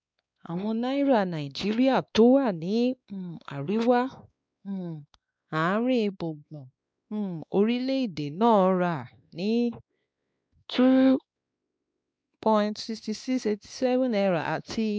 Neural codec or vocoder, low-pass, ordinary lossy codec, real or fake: codec, 16 kHz, 2 kbps, X-Codec, WavLM features, trained on Multilingual LibriSpeech; none; none; fake